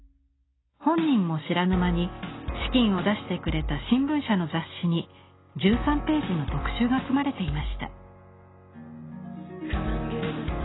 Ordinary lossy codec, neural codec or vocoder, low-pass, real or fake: AAC, 16 kbps; none; 7.2 kHz; real